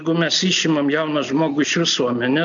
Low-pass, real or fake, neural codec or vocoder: 7.2 kHz; real; none